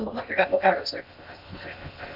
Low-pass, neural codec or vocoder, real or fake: 5.4 kHz; codec, 16 kHz in and 24 kHz out, 0.6 kbps, FocalCodec, streaming, 2048 codes; fake